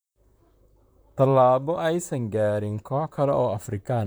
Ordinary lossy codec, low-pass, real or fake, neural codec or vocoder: none; none; fake; vocoder, 44.1 kHz, 128 mel bands, Pupu-Vocoder